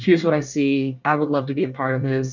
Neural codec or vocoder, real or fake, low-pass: codec, 24 kHz, 1 kbps, SNAC; fake; 7.2 kHz